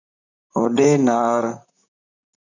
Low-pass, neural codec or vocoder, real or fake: 7.2 kHz; vocoder, 44.1 kHz, 128 mel bands, Pupu-Vocoder; fake